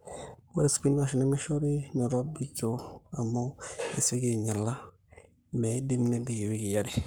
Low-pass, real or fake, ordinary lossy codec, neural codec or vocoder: none; fake; none; codec, 44.1 kHz, 7.8 kbps, Pupu-Codec